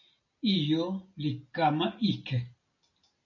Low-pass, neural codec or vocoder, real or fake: 7.2 kHz; none; real